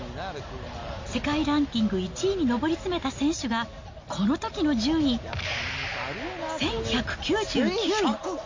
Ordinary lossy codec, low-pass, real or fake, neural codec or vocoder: MP3, 48 kbps; 7.2 kHz; real; none